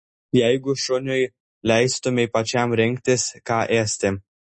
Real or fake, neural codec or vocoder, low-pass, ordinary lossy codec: real; none; 9.9 kHz; MP3, 32 kbps